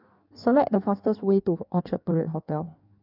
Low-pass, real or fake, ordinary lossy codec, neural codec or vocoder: 5.4 kHz; fake; none; codec, 16 kHz in and 24 kHz out, 1.1 kbps, FireRedTTS-2 codec